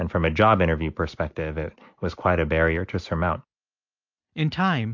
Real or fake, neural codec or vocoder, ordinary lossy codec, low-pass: real; none; MP3, 48 kbps; 7.2 kHz